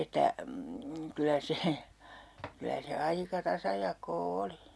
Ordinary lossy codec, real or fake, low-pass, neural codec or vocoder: none; real; none; none